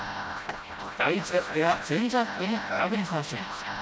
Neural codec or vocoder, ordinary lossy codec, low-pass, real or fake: codec, 16 kHz, 0.5 kbps, FreqCodec, smaller model; none; none; fake